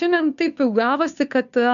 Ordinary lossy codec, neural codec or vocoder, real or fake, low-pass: MP3, 96 kbps; codec, 16 kHz, 2 kbps, FunCodec, trained on Chinese and English, 25 frames a second; fake; 7.2 kHz